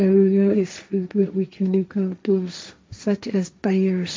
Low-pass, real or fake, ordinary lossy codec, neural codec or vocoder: none; fake; none; codec, 16 kHz, 1.1 kbps, Voila-Tokenizer